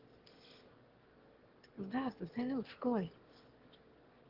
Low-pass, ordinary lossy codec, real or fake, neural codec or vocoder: 5.4 kHz; Opus, 16 kbps; fake; autoencoder, 22.05 kHz, a latent of 192 numbers a frame, VITS, trained on one speaker